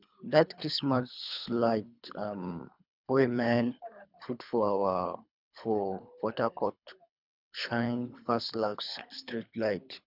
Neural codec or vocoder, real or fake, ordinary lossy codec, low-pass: codec, 24 kHz, 3 kbps, HILCodec; fake; none; 5.4 kHz